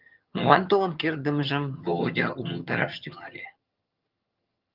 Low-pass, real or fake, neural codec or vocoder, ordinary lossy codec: 5.4 kHz; fake; vocoder, 22.05 kHz, 80 mel bands, HiFi-GAN; Opus, 32 kbps